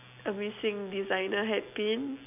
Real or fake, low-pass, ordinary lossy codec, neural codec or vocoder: real; 3.6 kHz; none; none